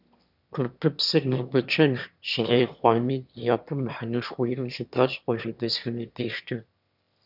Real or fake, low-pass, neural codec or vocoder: fake; 5.4 kHz; autoencoder, 22.05 kHz, a latent of 192 numbers a frame, VITS, trained on one speaker